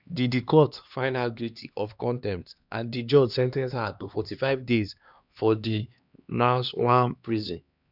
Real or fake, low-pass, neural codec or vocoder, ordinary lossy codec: fake; 5.4 kHz; codec, 16 kHz, 2 kbps, X-Codec, HuBERT features, trained on LibriSpeech; none